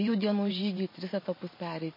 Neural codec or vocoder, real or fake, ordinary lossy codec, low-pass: vocoder, 44.1 kHz, 128 mel bands every 512 samples, BigVGAN v2; fake; MP3, 24 kbps; 5.4 kHz